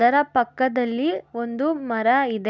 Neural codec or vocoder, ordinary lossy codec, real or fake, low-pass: none; none; real; none